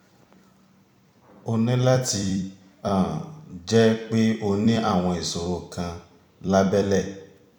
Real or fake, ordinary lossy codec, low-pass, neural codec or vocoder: fake; none; 19.8 kHz; vocoder, 44.1 kHz, 128 mel bands every 256 samples, BigVGAN v2